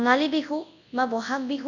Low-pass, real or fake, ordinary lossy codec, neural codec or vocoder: 7.2 kHz; fake; none; codec, 24 kHz, 0.9 kbps, WavTokenizer, large speech release